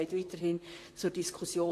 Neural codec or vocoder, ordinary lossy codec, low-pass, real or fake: vocoder, 44.1 kHz, 128 mel bands, Pupu-Vocoder; AAC, 48 kbps; 14.4 kHz; fake